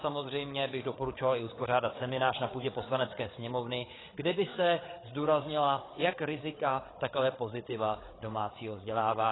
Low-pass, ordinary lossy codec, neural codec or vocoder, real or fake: 7.2 kHz; AAC, 16 kbps; codec, 16 kHz, 8 kbps, FreqCodec, larger model; fake